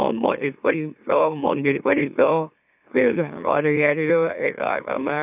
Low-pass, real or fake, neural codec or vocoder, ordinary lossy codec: 3.6 kHz; fake; autoencoder, 44.1 kHz, a latent of 192 numbers a frame, MeloTTS; none